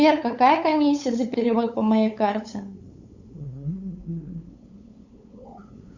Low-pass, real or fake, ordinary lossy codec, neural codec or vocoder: 7.2 kHz; fake; Opus, 64 kbps; codec, 16 kHz, 8 kbps, FunCodec, trained on LibriTTS, 25 frames a second